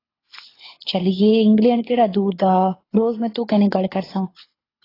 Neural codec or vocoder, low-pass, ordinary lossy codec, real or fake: codec, 24 kHz, 6 kbps, HILCodec; 5.4 kHz; AAC, 24 kbps; fake